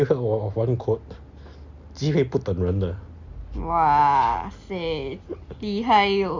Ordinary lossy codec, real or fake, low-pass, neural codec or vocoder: Opus, 64 kbps; real; 7.2 kHz; none